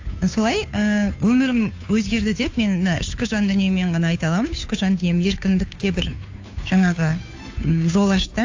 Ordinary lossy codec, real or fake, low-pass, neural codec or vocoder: AAC, 48 kbps; fake; 7.2 kHz; codec, 16 kHz, 2 kbps, FunCodec, trained on Chinese and English, 25 frames a second